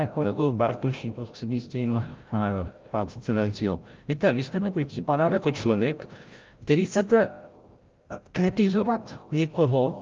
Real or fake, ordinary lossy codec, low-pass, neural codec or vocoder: fake; Opus, 32 kbps; 7.2 kHz; codec, 16 kHz, 0.5 kbps, FreqCodec, larger model